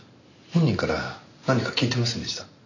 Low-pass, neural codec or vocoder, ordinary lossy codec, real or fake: 7.2 kHz; none; none; real